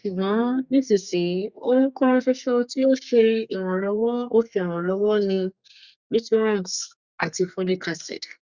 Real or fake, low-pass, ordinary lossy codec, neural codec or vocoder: fake; 7.2 kHz; Opus, 64 kbps; codec, 32 kHz, 1.9 kbps, SNAC